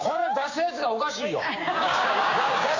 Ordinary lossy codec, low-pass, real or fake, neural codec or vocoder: AAC, 48 kbps; 7.2 kHz; fake; codec, 16 kHz, 6 kbps, DAC